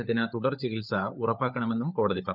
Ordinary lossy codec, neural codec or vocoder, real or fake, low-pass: none; codec, 16 kHz, 16 kbps, FunCodec, trained on LibriTTS, 50 frames a second; fake; 5.4 kHz